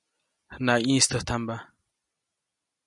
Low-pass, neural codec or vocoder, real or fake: 10.8 kHz; none; real